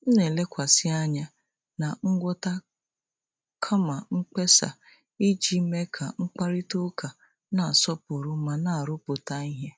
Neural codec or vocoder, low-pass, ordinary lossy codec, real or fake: none; none; none; real